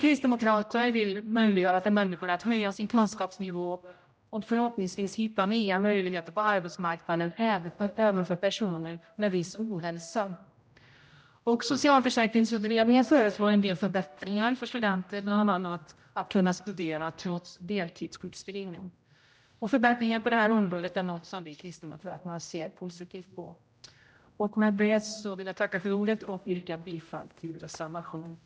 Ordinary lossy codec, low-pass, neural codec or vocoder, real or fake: none; none; codec, 16 kHz, 0.5 kbps, X-Codec, HuBERT features, trained on general audio; fake